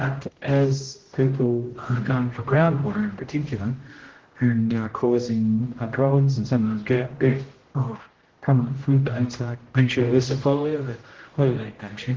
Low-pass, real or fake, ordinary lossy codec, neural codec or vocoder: 7.2 kHz; fake; Opus, 16 kbps; codec, 16 kHz, 0.5 kbps, X-Codec, HuBERT features, trained on general audio